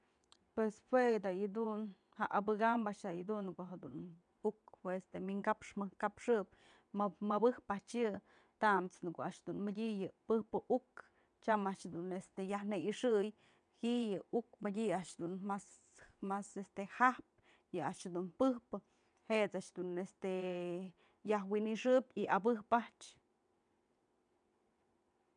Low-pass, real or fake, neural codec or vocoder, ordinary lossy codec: 9.9 kHz; fake; vocoder, 22.05 kHz, 80 mel bands, WaveNeXt; none